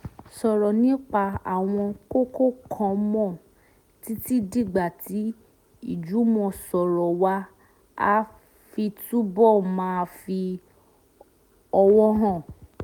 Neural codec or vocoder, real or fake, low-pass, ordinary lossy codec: none; real; 19.8 kHz; none